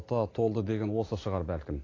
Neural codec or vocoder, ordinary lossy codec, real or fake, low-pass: none; AAC, 32 kbps; real; 7.2 kHz